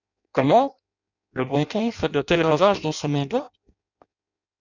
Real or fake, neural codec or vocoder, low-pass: fake; codec, 16 kHz in and 24 kHz out, 0.6 kbps, FireRedTTS-2 codec; 7.2 kHz